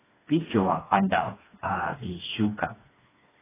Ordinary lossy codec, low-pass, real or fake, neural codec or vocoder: AAC, 16 kbps; 3.6 kHz; fake; codec, 16 kHz, 2 kbps, FreqCodec, smaller model